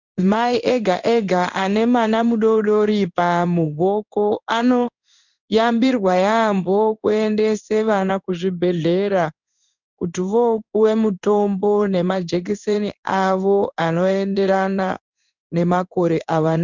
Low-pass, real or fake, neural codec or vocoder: 7.2 kHz; fake; codec, 16 kHz in and 24 kHz out, 1 kbps, XY-Tokenizer